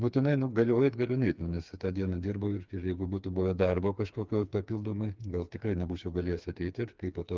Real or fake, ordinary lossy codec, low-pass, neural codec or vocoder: fake; Opus, 24 kbps; 7.2 kHz; codec, 16 kHz, 4 kbps, FreqCodec, smaller model